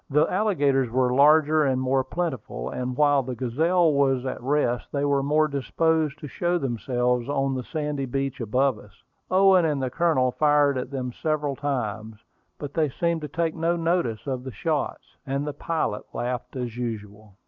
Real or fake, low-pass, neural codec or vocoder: real; 7.2 kHz; none